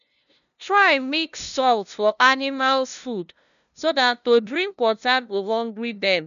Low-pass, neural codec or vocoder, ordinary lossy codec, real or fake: 7.2 kHz; codec, 16 kHz, 0.5 kbps, FunCodec, trained on LibriTTS, 25 frames a second; none; fake